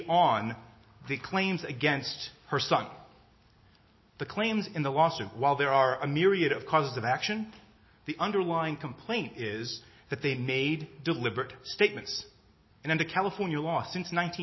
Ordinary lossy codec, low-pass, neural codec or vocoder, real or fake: MP3, 24 kbps; 7.2 kHz; none; real